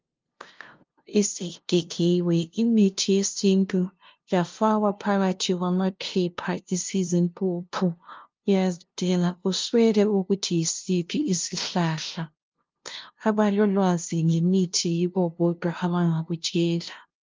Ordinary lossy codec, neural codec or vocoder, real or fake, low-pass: Opus, 32 kbps; codec, 16 kHz, 0.5 kbps, FunCodec, trained on LibriTTS, 25 frames a second; fake; 7.2 kHz